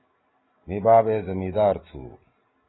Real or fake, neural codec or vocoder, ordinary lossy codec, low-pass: real; none; AAC, 16 kbps; 7.2 kHz